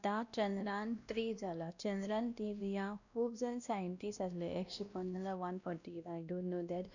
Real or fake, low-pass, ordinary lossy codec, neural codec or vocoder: fake; 7.2 kHz; none; codec, 16 kHz, 1 kbps, X-Codec, WavLM features, trained on Multilingual LibriSpeech